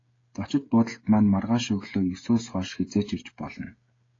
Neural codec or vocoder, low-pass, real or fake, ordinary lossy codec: codec, 16 kHz, 16 kbps, FreqCodec, smaller model; 7.2 kHz; fake; AAC, 32 kbps